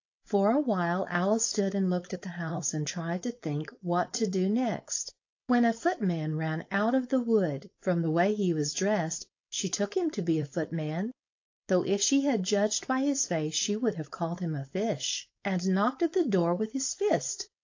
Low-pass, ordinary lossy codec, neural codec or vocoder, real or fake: 7.2 kHz; AAC, 48 kbps; codec, 16 kHz, 4.8 kbps, FACodec; fake